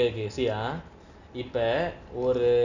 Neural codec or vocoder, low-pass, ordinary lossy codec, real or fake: none; 7.2 kHz; none; real